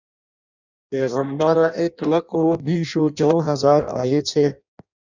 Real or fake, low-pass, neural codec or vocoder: fake; 7.2 kHz; codec, 16 kHz in and 24 kHz out, 0.6 kbps, FireRedTTS-2 codec